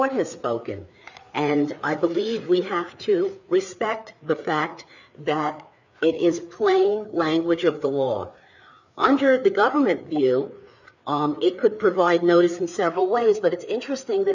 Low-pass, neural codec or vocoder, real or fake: 7.2 kHz; codec, 16 kHz, 4 kbps, FreqCodec, larger model; fake